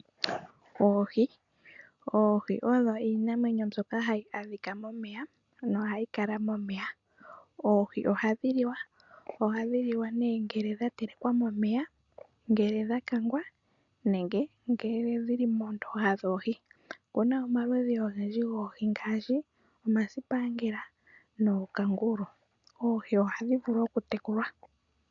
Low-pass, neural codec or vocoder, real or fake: 7.2 kHz; none; real